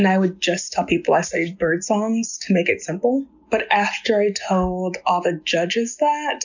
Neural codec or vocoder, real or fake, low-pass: none; real; 7.2 kHz